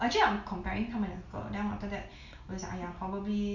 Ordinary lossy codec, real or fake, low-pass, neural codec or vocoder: none; real; 7.2 kHz; none